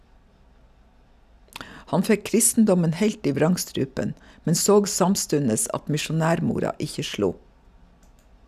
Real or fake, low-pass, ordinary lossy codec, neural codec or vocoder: real; 14.4 kHz; none; none